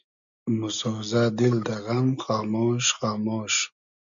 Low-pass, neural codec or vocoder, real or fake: 7.2 kHz; none; real